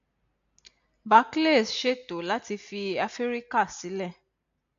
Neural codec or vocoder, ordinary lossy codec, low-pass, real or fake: none; AAC, 64 kbps; 7.2 kHz; real